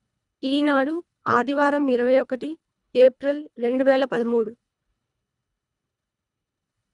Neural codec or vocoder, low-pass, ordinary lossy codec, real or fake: codec, 24 kHz, 1.5 kbps, HILCodec; 10.8 kHz; none; fake